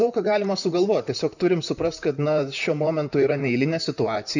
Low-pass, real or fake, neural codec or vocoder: 7.2 kHz; fake; vocoder, 44.1 kHz, 128 mel bands, Pupu-Vocoder